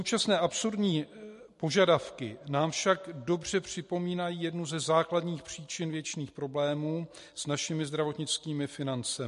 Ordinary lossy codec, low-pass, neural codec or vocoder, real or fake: MP3, 48 kbps; 14.4 kHz; none; real